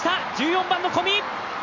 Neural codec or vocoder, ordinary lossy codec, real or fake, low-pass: none; none; real; 7.2 kHz